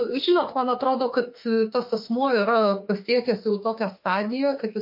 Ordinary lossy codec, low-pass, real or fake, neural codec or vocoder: MP3, 32 kbps; 5.4 kHz; fake; autoencoder, 48 kHz, 32 numbers a frame, DAC-VAE, trained on Japanese speech